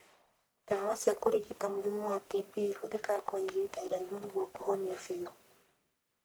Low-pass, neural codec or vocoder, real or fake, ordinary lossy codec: none; codec, 44.1 kHz, 1.7 kbps, Pupu-Codec; fake; none